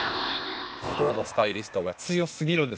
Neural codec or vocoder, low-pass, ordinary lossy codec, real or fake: codec, 16 kHz, 0.8 kbps, ZipCodec; none; none; fake